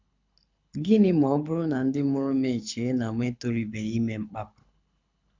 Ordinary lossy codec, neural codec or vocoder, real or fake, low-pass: MP3, 64 kbps; codec, 24 kHz, 6 kbps, HILCodec; fake; 7.2 kHz